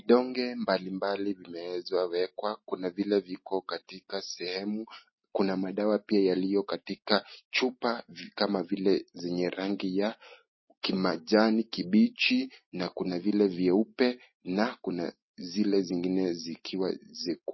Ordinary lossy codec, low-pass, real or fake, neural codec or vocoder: MP3, 24 kbps; 7.2 kHz; real; none